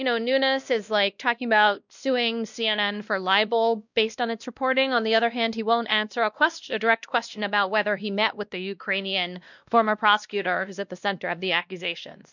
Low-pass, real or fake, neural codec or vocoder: 7.2 kHz; fake; codec, 16 kHz, 1 kbps, X-Codec, WavLM features, trained on Multilingual LibriSpeech